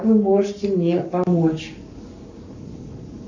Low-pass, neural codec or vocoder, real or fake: 7.2 kHz; codec, 44.1 kHz, 7.8 kbps, Pupu-Codec; fake